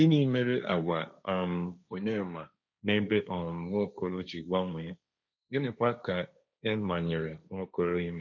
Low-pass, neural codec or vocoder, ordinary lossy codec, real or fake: 7.2 kHz; codec, 16 kHz, 1.1 kbps, Voila-Tokenizer; none; fake